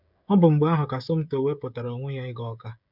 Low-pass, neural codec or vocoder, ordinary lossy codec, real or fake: 5.4 kHz; codec, 16 kHz, 16 kbps, FreqCodec, smaller model; none; fake